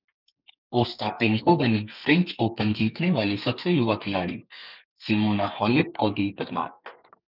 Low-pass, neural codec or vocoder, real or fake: 5.4 kHz; codec, 32 kHz, 1.9 kbps, SNAC; fake